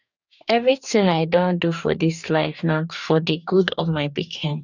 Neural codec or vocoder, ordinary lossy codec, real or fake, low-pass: codec, 44.1 kHz, 2.6 kbps, DAC; none; fake; 7.2 kHz